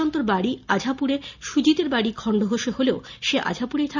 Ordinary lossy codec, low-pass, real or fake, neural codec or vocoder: none; 7.2 kHz; real; none